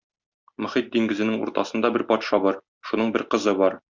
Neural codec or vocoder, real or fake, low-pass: none; real; 7.2 kHz